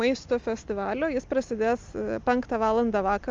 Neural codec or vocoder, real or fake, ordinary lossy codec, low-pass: none; real; Opus, 32 kbps; 7.2 kHz